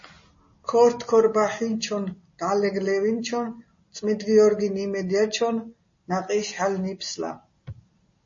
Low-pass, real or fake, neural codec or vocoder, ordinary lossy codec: 7.2 kHz; real; none; MP3, 32 kbps